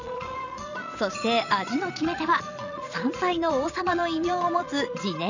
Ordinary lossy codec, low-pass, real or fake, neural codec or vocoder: none; 7.2 kHz; fake; vocoder, 22.05 kHz, 80 mel bands, Vocos